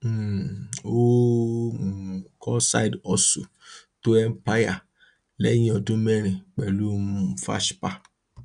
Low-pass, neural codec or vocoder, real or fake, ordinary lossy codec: 9.9 kHz; none; real; none